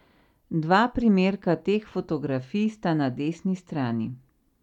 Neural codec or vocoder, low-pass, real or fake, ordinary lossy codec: none; 19.8 kHz; real; none